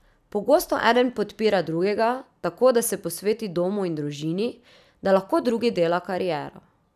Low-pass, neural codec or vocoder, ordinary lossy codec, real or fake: 14.4 kHz; none; none; real